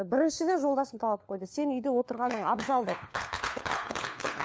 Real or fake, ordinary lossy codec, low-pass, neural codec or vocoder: fake; none; none; codec, 16 kHz, 4 kbps, FunCodec, trained on LibriTTS, 50 frames a second